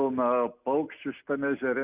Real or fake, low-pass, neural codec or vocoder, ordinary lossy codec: real; 3.6 kHz; none; Opus, 64 kbps